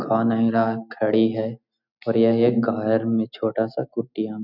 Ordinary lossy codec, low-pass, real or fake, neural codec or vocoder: none; 5.4 kHz; real; none